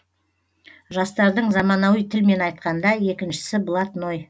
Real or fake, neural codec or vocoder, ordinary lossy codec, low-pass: real; none; none; none